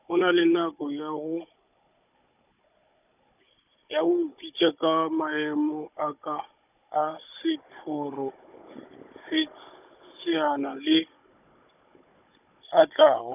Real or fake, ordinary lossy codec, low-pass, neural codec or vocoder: fake; none; 3.6 kHz; codec, 16 kHz, 16 kbps, FunCodec, trained on Chinese and English, 50 frames a second